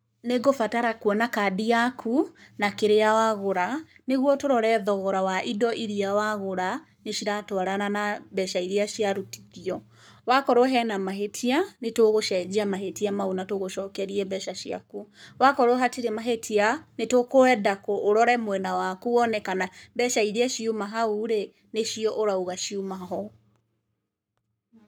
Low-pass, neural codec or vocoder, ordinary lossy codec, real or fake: none; codec, 44.1 kHz, 7.8 kbps, Pupu-Codec; none; fake